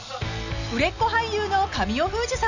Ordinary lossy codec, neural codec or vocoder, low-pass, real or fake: none; none; 7.2 kHz; real